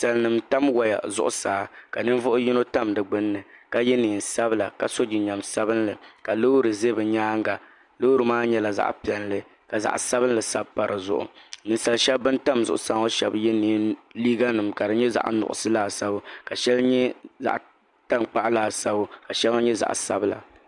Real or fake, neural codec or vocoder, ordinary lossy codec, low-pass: real; none; MP3, 96 kbps; 10.8 kHz